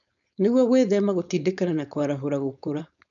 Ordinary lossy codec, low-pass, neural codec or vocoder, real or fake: none; 7.2 kHz; codec, 16 kHz, 4.8 kbps, FACodec; fake